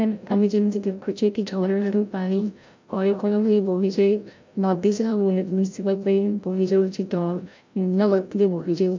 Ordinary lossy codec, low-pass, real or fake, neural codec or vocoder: MP3, 64 kbps; 7.2 kHz; fake; codec, 16 kHz, 0.5 kbps, FreqCodec, larger model